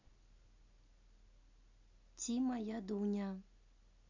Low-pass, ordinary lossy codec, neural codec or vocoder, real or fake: 7.2 kHz; none; none; real